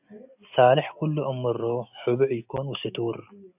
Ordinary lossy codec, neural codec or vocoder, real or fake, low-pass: AAC, 32 kbps; none; real; 3.6 kHz